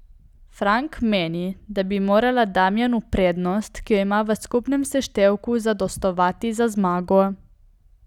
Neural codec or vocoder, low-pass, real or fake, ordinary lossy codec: none; 19.8 kHz; real; none